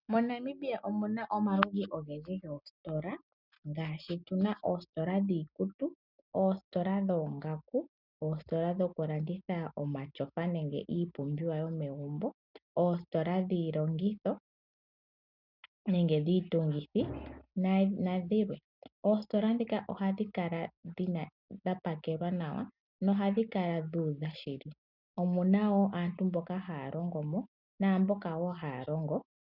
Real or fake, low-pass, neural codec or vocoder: real; 5.4 kHz; none